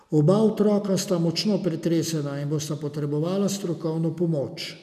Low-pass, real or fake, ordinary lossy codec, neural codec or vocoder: 14.4 kHz; real; none; none